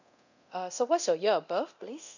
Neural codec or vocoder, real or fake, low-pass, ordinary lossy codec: codec, 24 kHz, 0.9 kbps, DualCodec; fake; 7.2 kHz; none